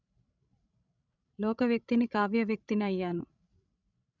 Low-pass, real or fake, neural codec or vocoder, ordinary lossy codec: 7.2 kHz; fake; codec, 16 kHz, 16 kbps, FreqCodec, larger model; none